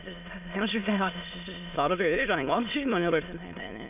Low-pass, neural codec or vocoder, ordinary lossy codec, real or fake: 3.6 kHz; autoencoder, 22.05 kHz, a latent of 192 numbers a frame, VITS, trained on many speakers; none; fake